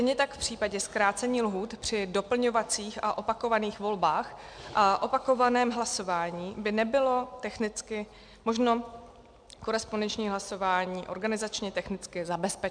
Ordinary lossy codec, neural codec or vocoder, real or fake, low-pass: MP3, 96 kbps; none; real; 9.9 kHz